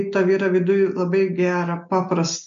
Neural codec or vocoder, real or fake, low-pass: none; real; 7.2 kHz